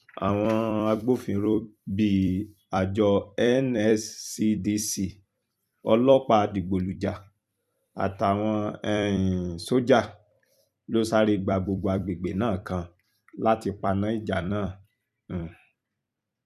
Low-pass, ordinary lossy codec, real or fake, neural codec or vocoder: 14.4 kHz; none; fake; vocoder, 44.1 kHz, 128 mel bands every 256 samples, BigVGAN v2